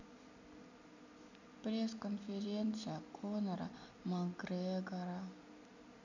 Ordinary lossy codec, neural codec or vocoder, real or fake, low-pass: none; none; real; 7.2 kHz